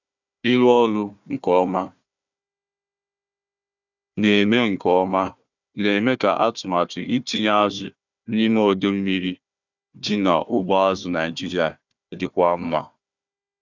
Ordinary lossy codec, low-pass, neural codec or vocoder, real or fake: none; 7.2 kHz; codec, 16 kHz, 1 kbps, FunCodec, trained on Chinese and English, 50 frames a second; fake